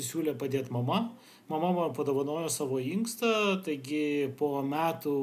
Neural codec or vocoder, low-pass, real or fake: none; 14.4 kHz; real